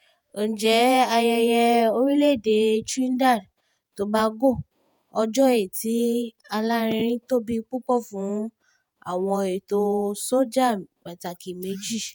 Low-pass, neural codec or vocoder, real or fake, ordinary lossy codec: none; vocoder, 48 kHz, 128 mel bands, Vocos; fake; none